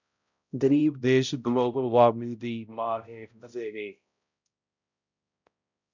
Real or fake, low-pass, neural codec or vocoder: fake; 7.2 kHz; codec, 16 kHz, 0.5 kbps, X-Codec, HuBERT features, trained on balanced general audio